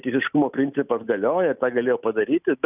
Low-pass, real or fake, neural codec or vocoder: 3.6 kHz; real; none